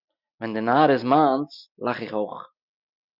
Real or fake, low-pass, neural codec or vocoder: real; 5.4 kHz; none